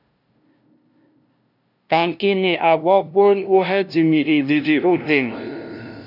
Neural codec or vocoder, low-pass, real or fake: codec, 16 kHz, 0.5 kbps, FunCodec, trained on LibriTTS, 25 frames a second; 5.4 kHz; fake